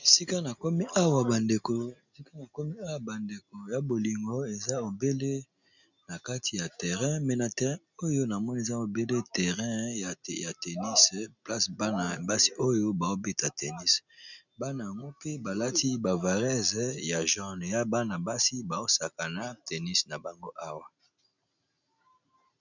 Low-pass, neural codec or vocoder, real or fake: 7.2 kHz; none; real